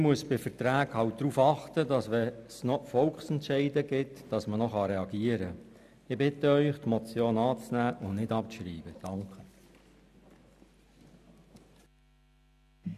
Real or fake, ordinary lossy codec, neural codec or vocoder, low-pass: real; none; none; 14.4 kHz